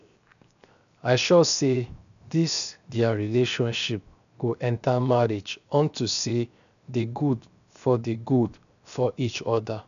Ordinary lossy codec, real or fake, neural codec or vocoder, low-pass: none; fake; codec, 16 kHz, 0.7 kbps, FocalCodec; 7.2 kHz